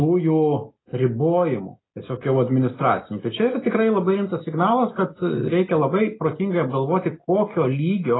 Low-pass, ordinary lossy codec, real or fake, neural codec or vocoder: 7.2 kHz; AAC, 16 kbps; real; none